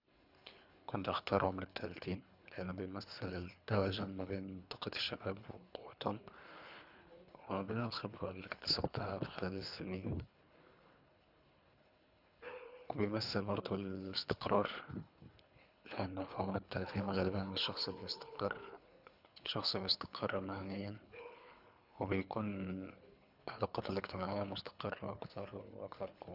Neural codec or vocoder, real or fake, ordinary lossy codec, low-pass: codec, 24 kHz, 3 kbps, HILCodec; fake; none; 5.4 kHz